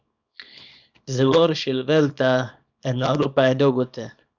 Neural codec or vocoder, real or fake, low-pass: codec, 24 kHz, 0.9 kbps, WavTokenizer, small release; fake; 7.2 kHz